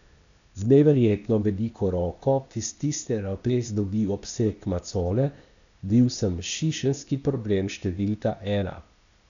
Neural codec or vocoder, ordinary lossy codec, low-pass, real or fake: codec, 16 kHz, 0.8 kbps, ZipCodec; none; 7.2 kHz; fake